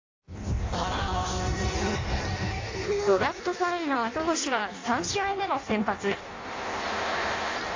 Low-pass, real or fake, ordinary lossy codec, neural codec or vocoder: 7.2 kHz; fake; AAC, 32 kbps; codec, 16 kHz in and 24 kHz out, 0.6 kbps, FireRedTTS-2 codec